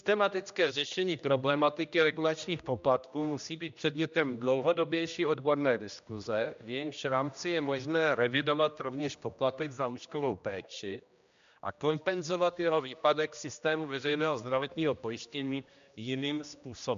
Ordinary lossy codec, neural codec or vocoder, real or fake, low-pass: MP3, 64 kbps; codec, 16 kHz, 1 kbps, X-Codec, HuBERT features, trained on general audio; fake; 7.2 kHz